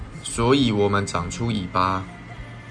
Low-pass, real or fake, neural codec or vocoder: 9.9 kHz; real; none